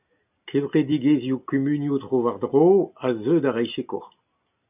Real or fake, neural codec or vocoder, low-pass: real; none; 3.6 kHz